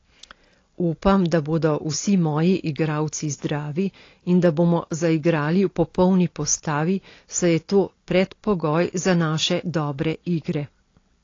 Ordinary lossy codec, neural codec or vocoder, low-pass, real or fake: AAC, 32 kbps; none; 7.2 kHz; real